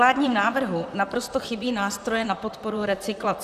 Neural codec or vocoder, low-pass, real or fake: vocoder, 44.1 kHz, 128 mel bands, Pupu-Vocoder; 14.4 kHz; fake